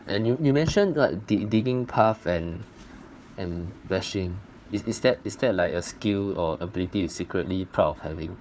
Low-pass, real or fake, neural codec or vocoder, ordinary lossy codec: none; fake; codec, 16 kHz, 4 kbps, FunCodec, trained on Chinese and English, 50 frames a second; none